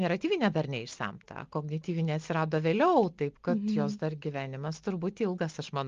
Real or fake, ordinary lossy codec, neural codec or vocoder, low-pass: real; Opus, 32 kbps; none; 7.2 kHz